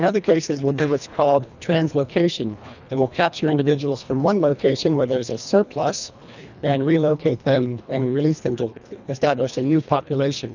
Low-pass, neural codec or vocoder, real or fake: 7.2 kHz; codec, 24 kHz, 1.5 kbps, HILCodec; fake